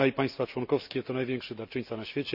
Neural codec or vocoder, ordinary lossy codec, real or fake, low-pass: none; none; real; 5.4 kHz